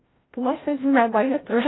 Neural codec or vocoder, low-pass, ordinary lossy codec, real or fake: codec, 16 kHz, 0.5 kbps, FreqCodec, larger model; 7.2 kHz; AAC, 16 kbps; fake